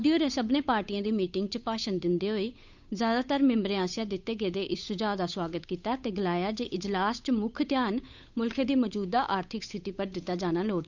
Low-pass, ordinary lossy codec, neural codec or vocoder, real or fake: 7.2 kHz; none; codec, 16 kHz, 8 kbps, FunCodec, trained on Chinese and English, 25 frames a second; fake